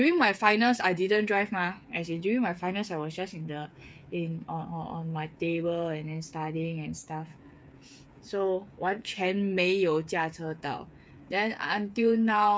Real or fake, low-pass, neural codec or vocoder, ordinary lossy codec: fake; none; codec, 16 kHz, 8 kbps, FreqCodec, smaller model; none